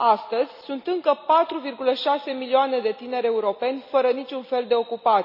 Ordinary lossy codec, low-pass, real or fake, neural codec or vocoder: none; 5.4 kHz; real; none